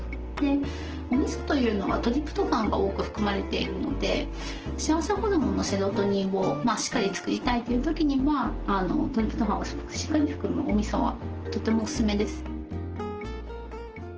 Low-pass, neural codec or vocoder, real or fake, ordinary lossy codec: 7.2 kHz; none; real; Opus, 16 kbps